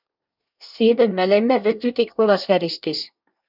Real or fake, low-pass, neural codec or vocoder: fake; 5.4 kHz; codec, 24 kHz, 1 kbps, SNAC